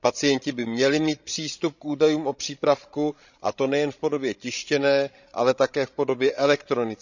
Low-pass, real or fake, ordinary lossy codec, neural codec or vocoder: 7.2 kHz; fake; none; codec, 16 kHz, 16 kbps, FreqCodec, larger model